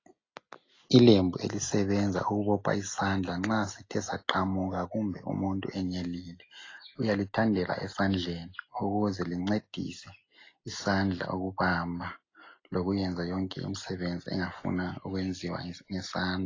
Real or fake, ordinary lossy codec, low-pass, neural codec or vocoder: real; AAC, 32 kbps; 7.2 kHz; none